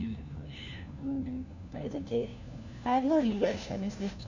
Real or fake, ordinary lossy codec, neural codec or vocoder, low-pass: fake; none; codec, 16 kHz, 1 kbps, FunCodec, trained on LibriTTS, 50 frames a second; 7.2 kHz